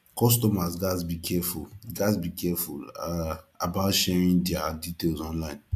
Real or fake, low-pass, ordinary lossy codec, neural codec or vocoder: real; 14.4 kHz; AAC, 96 kbps; none